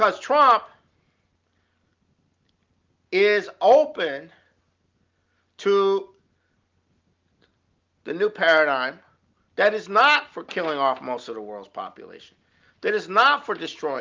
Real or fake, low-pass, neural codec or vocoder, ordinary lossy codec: real; 7.2 kHz; none; Opus, 24 kbps